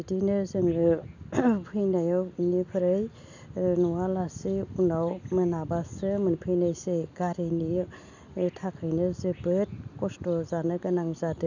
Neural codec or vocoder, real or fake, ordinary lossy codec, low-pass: none; real; none; 7.2 kHz